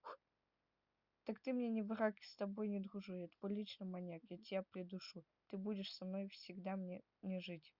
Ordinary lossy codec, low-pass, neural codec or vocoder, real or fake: Opus, 64 kbps; 5.4 kHz; none; real